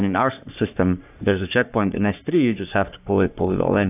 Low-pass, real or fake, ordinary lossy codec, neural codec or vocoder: 3.6 kHz; fake; AAC, 32 kbps; codec, 44.1 kHz, 3.4 kbps, Pupu-Codec